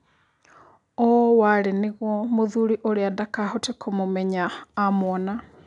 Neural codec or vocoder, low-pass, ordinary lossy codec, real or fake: none; 10.8 kHz; none; real